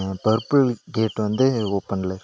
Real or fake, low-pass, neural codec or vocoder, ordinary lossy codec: real; none; none; none